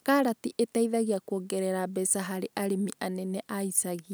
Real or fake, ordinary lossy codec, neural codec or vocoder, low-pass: real; none; none; none